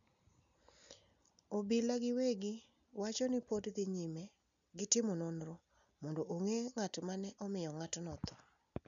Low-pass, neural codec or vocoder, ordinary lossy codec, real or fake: 7.2 kHz; none; none; real